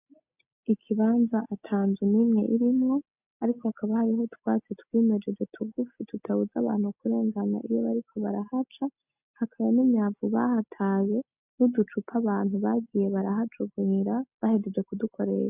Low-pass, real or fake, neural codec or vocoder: 3.6 kHz; real; none